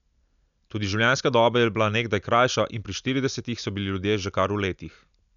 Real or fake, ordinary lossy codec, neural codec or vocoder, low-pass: real; none; none; 7.2 kHz